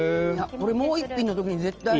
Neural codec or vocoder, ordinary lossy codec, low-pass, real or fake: none; Opus, 24 kbps; 7.2 kHz; real